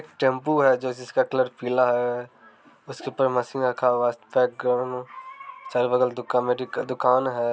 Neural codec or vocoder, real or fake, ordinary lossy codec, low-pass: none; real; none; none